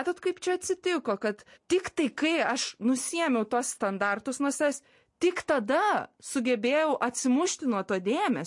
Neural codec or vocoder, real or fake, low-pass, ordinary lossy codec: none; real; 10.8 kHz; MP3, 48 kbps